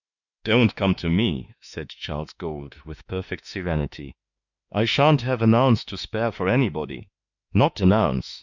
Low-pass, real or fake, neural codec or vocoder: 7.2 kHz; fake; autoencoder, 48 kHz, 32 numbers a frame, DAC-VAE, trained on Japanese speech